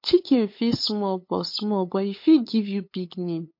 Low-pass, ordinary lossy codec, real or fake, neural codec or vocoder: 5.4 kHz; MP3, 32 kbps; fake; codec, 16 kHz, 8 kbps, FunCodec, trained on LibriTTS, 25 frames a second